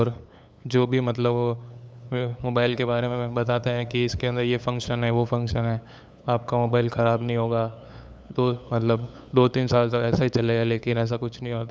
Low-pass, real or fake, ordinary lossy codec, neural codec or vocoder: none; fake; none; codec, 16 kHz, 8 kbps, FunCodec, trained on LibriTTS, 25 frames a second